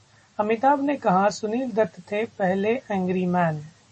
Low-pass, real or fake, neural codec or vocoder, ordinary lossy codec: 10.8 kHz; real; none; MP3, 32 kbps